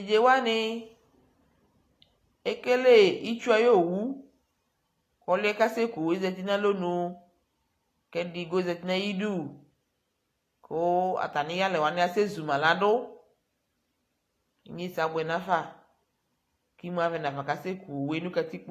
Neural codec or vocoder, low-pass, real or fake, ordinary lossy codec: none; 14.4 kHz; real; AAC, 48 kbps